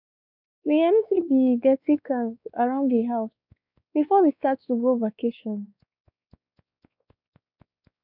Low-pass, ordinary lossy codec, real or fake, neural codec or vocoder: 5.4 kHz; none; fake; codec, 16 kHz, 2 kbps, X-Codec, WavLM features, trained on Multilingual LibriSpeech